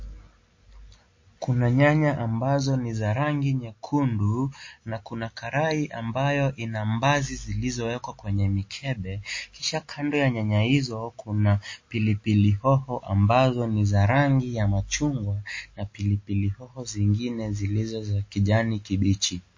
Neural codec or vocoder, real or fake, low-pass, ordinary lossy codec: none; real; 7.2 kHz; MP3, 32 kbps